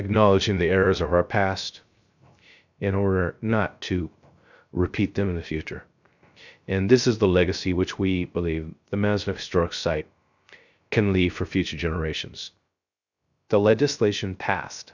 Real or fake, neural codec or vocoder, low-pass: fake; codec, 16 kHz, 0.3 kbps, FocalCodec; 7.2 kHz